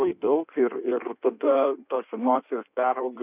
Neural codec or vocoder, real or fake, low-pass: codec, 16 kHz in and 24 kHz out, 1.1 kbps, FireRedTTS-2 codec; fake; 3.6 kHz